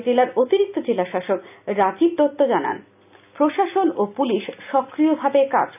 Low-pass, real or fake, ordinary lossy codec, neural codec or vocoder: 3.6 kHz; real; none; none